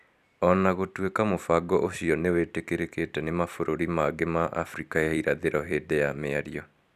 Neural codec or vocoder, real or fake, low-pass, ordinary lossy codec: vocoder, 44.1 kHz, 128 mel bands every 256 samples, BigVGAN v2; fake; 14.4 kHz; none